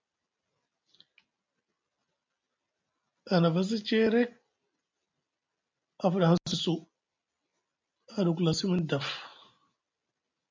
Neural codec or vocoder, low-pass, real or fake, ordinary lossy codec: none; 7.2 kHz; real; MP3, 64 kbps